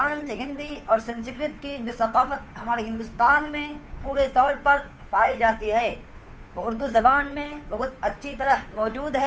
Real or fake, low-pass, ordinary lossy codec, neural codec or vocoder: fake; none; none; codec, 16 kHz, 2 kbps, FunCodec, trained on Chinese and English, 25 frames a second